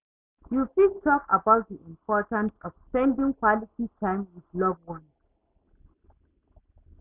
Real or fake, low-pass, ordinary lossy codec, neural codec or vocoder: real; 3.6 kHz; none; none